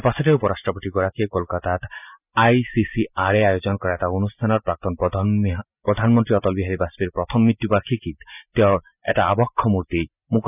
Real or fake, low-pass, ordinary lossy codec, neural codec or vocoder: real; 3.6 kHz; none; none